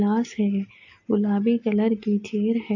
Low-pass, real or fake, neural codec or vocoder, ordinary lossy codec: 7.2 kHz; real; none; AAC, 48 kbps